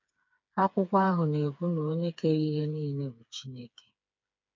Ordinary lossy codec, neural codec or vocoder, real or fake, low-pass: MP3, 64 kbps; codec, 16 kHz, 4 kbps, FreqCodec, smaller model; fake; 7.2 kHz